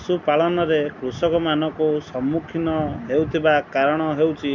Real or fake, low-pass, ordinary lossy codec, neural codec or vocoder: real; 7.2 kHz; none; none